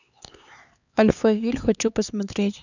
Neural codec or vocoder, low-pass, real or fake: codec, 16 kHz, 4 kbps, X-Codec, HuBERT features, trained on LibriSpeech; 7.2 kHz; fake